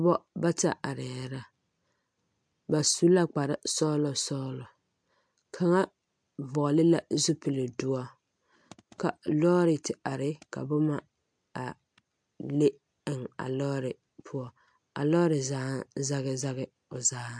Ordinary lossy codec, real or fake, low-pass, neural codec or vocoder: MP3, 64 kbps; real; 9.9 kHz; none